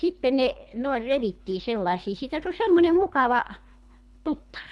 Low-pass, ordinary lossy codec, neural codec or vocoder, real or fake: none; none; codec, 24 kHz, 3 kbps, HILCodec; fake